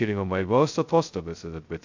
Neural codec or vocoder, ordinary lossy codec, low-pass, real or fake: codec, 16 kHz, 0.2 kbps, FocalCodec; AAC, 48 kbps; 7.2 kHz; fake